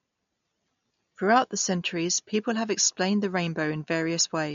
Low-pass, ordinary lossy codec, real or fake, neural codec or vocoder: 7.2 kHz; MP3, 48 kbps; real; none